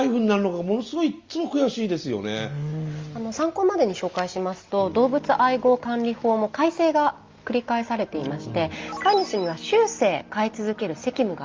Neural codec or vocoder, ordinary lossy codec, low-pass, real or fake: none; Opus, 32 kbps; 7.2 kHz; real